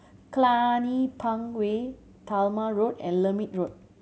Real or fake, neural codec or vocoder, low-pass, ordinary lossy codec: real; none; none; none